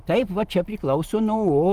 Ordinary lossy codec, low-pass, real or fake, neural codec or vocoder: Opus, 32 kbps; 14.4 kHz; fake; vocoder, 44.1 kHz, 128 mel bands every 512 samples, BigVGAN v2